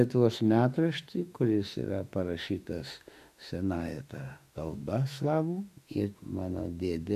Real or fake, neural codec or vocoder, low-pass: fake; autoencoder, 48 kHz, 32 numbers a frame, DAC-VAE, trained on Japanese speech; 14.4 kHz